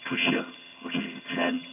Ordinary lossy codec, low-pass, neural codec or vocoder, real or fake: none; 3.6 kHz; vocoder, 22.05 kHz, 80 mel bands, HiFi-GAN; fake